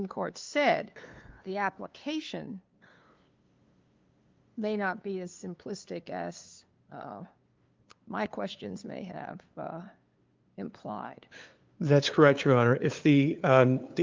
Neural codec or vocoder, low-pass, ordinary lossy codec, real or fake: codec, 16 kHz, 2 kbps, FunCodec, trained on LibriTTS, 25 frames a second; 7.2 kHz; Opus, 24 kbps; fake